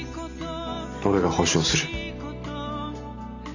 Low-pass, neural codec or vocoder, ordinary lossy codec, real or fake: 7.2 kHz; none; none; real